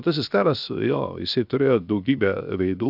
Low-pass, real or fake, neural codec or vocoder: 5.4 kHz; fake; codec, 16 kHz, 0.7 kbps, FocalCodec